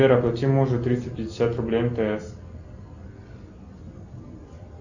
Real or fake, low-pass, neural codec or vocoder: real; 7.2 kHz; none